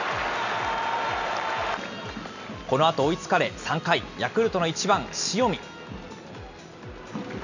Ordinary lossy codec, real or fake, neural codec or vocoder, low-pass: none; real; none; 7.2 kHz